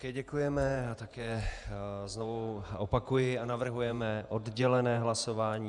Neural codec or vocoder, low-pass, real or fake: vocoder, 48 kHz, 128 mel bands, Vocos; 10.8 kHz; fake